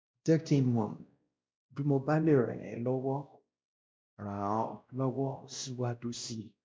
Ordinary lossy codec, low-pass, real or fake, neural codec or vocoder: none; none; fake; codec, 16 kHz, 1 kbps, X-Codec, WavLM features, trained on Multilingual LibriSpeech